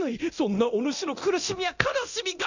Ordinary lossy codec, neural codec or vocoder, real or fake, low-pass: none; codec, 24 kHz, 0.9 kbps, DualCodec; fake; 7.2 kHz